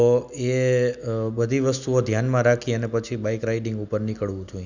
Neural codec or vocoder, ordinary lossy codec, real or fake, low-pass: none; none; real; 7.2 kHz